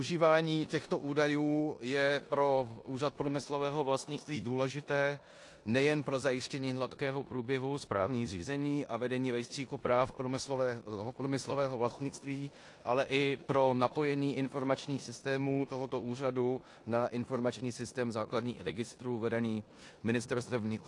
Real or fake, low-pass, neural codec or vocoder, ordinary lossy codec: fake; 10.8 kHz; codec, 16 kHz in and 24 kHz out, 0.9 kbps, LongCat-Audio-Codec, four codebook decoder; AAC, 48 kbps